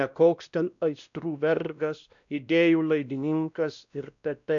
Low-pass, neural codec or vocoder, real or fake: 7.2 kHz; codec, 16 kHz, 1 kbps, X-Codec, WavLM features, trained on Multilingual LibriSpeech; fake